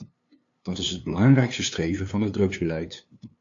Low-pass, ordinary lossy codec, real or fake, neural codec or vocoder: 7.2 kHz; AAC, 48 kbps; fake; codec, 16 kHz, 2 kbps, FunCodec, trained on LibriTTS, 25 frames a second